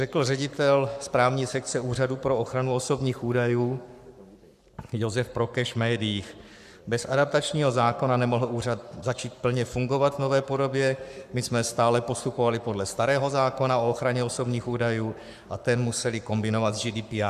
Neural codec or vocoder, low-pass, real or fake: codec, 44.1 kHz, 7.8 kbps, Pupu-Codec; 14.4 kHz; fake